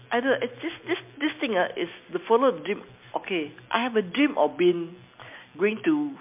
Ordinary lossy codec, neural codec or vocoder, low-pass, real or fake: MP3, 32 kbps; none; 3.6 kHz; real